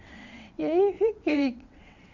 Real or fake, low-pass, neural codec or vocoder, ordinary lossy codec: fake; 7.2 kHz; vocoder, 22.05 kHz, 80 mel bands, WaveNeXt; none